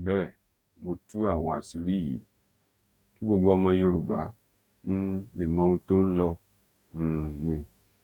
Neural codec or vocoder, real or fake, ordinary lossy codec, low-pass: codec, 44.1 kHz, 2.6 kbps, DAC; fake; none; 19.8 kHz